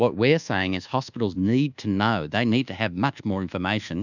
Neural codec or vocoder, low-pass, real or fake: codec, 24 kHz, 1.2 kbps, DualCodec; 7.2 kHz; fake